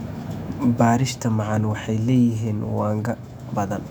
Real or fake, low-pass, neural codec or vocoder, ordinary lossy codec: fake; 19.8 kHz; autoencoder, 48 kHz, 128 numbers a frame, DAC-VAE, trained on Japanese speech; none